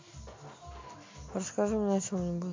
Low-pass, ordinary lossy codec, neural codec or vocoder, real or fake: 7.2 kHz; MP3, 48 kbps; none; real